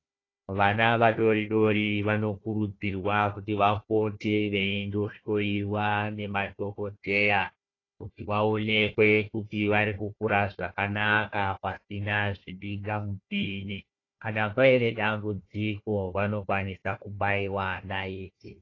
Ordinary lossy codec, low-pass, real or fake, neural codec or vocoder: AAC, 32 kbps; 7.2 kHz; fake; codec, 16 kHz, 1 kbps, FunCodec, trained on Chinese and English, 50 frames a second